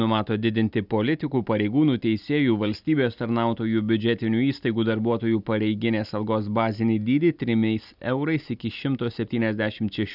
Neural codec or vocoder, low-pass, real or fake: none; 5.4 kHz; real